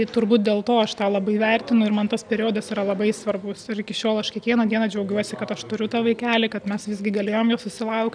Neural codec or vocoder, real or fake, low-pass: none; real; 9.9 kHz